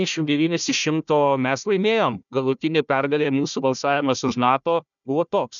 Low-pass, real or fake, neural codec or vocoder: 7.2 kHz; fake; codec, 16 kHz, 1 kbps, FunCodec, trained on Chinese and English, 50 frames a second